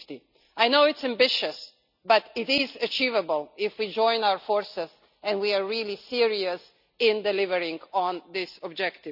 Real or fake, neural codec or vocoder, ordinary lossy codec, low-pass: real; none; none; 5.4 kHz